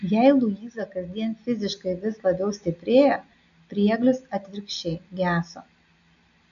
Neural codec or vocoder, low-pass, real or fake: none; 7.2 kHz; real